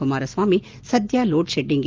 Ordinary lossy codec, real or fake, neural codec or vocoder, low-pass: Opus, 32 kbps; real; none; 7.2 kHz